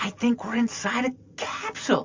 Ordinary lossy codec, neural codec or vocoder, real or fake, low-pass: MP3, 48 kbps; none; real; 7.2 kHz